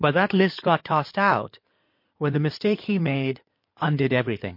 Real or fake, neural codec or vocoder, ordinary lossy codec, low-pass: fake; codec, 16 kHz in and 24 kHz out, 2.2 kbps, FireRedTTS-2 codec; MP3, 32 kbps; 5.4 kHz